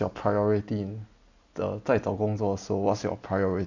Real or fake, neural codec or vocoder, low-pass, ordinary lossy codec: real; none; 7.2 kHz; none